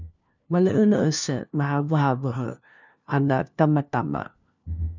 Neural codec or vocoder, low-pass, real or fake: codec, 16 kHz, 1 kbps, FunCodec, trained on LibriTTS, 50 frames a second; 7.2 kHz; fake